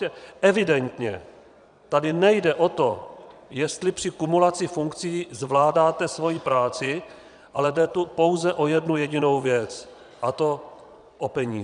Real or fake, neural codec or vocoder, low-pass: fake; vocoder, 22.05 kHz, 80 mel bands, Vocos; 9.9 kHz